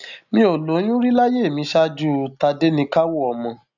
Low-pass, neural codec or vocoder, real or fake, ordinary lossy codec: 7.2 kHz; none; real; none